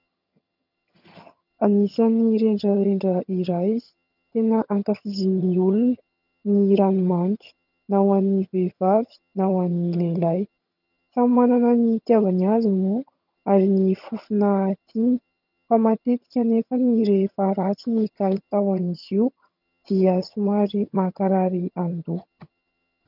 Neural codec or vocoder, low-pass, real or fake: vocoder, 22.05 kHz, 80 mel bands, HiFi-GAN; 5.4 kHz; fake